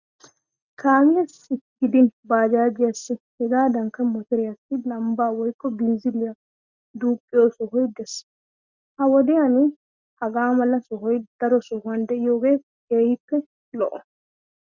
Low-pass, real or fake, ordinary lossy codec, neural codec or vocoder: 7.2 kHz; real; Opus, 64 kbps; none